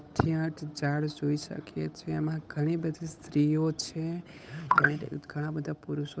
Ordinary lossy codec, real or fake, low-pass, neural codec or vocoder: none; fake; none; codec, 16 kHz, 8 kbps, FunCodec, trained on Chinese and English, 25 frames a second